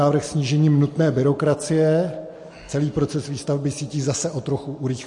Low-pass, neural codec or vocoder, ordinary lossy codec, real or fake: 10.8 kHz; none; MP3, 48 kbps; real